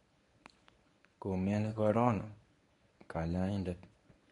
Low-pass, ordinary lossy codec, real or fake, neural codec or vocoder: 10.8 kHz; MP3, 64 kbps; fake; codec, 24 kHz, 0.9 kbps, WavTokenizer, medium speech release version 1